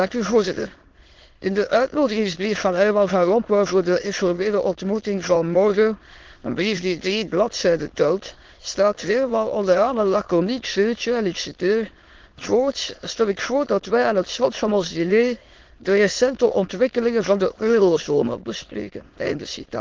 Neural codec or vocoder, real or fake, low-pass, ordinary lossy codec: autoencoder, 22.05 kHz, a latent of 192 numbers a frame, VITS, trained on many speakers; fake; 7.2 kHz; Opus, 16 kbps